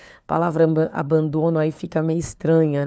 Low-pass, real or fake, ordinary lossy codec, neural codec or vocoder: none; fake; none; codec, 16 kHz, 4 kbps, FunCodec, trained on LibriTTS, 50 frames a second